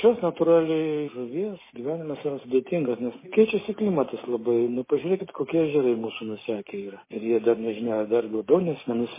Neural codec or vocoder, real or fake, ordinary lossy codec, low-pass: codec, 16 kHz, 6 kbps, DAC; fake; MP3, 16 kbps; 3.6 kHz